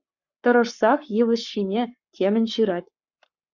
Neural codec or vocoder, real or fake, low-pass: codec, 44.1 kHz, 7.8 kbps, Pupu-Codec; fake; 7.2 kHz